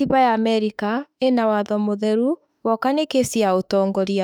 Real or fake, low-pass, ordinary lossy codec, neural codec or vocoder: fake; 19.8 kHz; none; autoencoder, 48 kHz, 32 numbers a frame, DAC-VAE, trained on Japanese speech